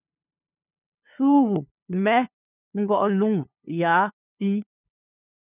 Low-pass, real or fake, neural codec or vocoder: 3.6 kHz; fake; codec, 16 kHz, 2 kbps, FunCodec, trained on LibriTTS, 25 frames a second